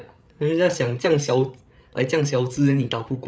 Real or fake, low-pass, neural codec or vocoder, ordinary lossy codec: fake; none; codec, 16 kHz, 8 kbps, FreqCodec, larger model; none